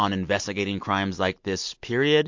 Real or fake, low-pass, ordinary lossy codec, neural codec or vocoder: real; 7.2 kHz; MP3, 48 kbps; none